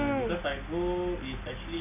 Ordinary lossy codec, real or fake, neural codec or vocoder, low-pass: none; real; none; 3.6 kHz